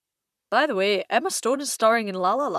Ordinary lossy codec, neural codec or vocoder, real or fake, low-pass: none; vocoder, 44.1 kHz, 128 mel bands, Pupu-Vocoder; fake; 14.4 kHz